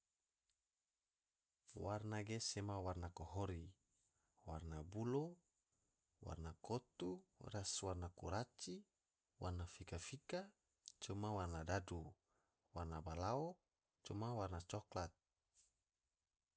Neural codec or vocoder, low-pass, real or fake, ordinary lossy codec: none; none; real; none